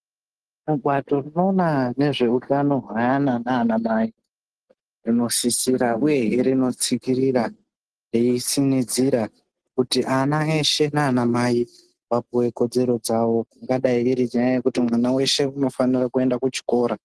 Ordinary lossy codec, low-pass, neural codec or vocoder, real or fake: Opus, 16 kbps; 10.8 kHz; none; real